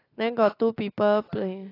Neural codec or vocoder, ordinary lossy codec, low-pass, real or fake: none; AAC, 24 kbps; 5.4 kHz; real